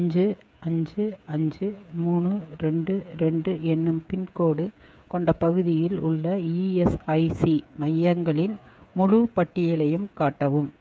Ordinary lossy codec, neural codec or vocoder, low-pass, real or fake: none; codec, 16 kHz, 8 kbps, FreqCodec, smaller model; none; fake